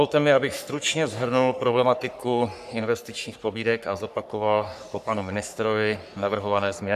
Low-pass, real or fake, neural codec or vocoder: 14.4 kHz; fake; codec, 44.1 kHz, 3.4 kbps, Pupu-Codec